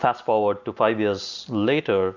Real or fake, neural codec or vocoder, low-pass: real; none; 7.2 kHz